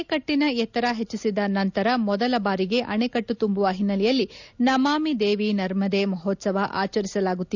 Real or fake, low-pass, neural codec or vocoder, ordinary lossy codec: real; 7.2 kHz; none; none